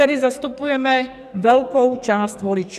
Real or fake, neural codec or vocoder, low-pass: fake; codec, 44.1 kHz, 2.6 kbps, SNAC; 14.4 kHz